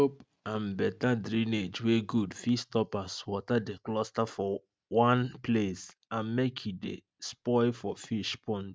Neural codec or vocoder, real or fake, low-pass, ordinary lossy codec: none; real; none; none